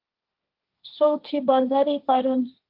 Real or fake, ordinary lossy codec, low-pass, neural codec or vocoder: fake; Opus, 24 kbps; 5.4 kHz; codec, 16 kHz, 1.1 kbps, Voila-Tokenizer